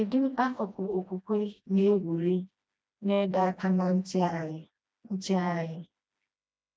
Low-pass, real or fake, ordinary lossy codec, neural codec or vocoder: none; fake; none; codec, 16 kHz, 1 kbps, FreqCodec, smaller model